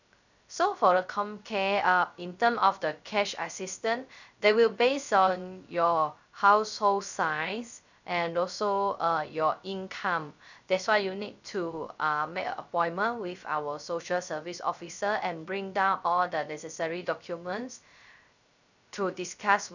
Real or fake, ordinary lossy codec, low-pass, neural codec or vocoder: fake; none; 7.2 kHz; codec, 16 kHz, 0.3 kbps, FocalCodec